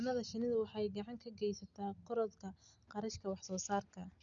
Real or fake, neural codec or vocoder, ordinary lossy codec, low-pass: real; none; none; 7.2 kHz